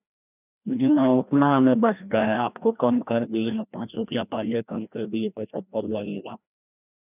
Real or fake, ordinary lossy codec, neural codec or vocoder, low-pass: fake; none; codec, 16 kHz, 1 kbps, FreqCodec, larger model; 3.6 kHz